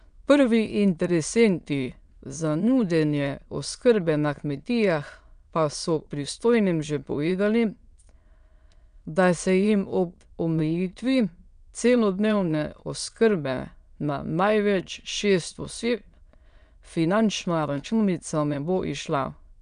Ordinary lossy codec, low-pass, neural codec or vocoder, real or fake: none; 9.9 kHz; autoencoder, 22.05 kHz, a latent of 192 numbers a frame, VITS, trained on many speakers; fake